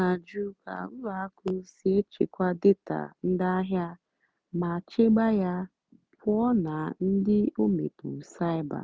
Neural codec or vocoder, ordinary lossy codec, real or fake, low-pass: none; none; real; none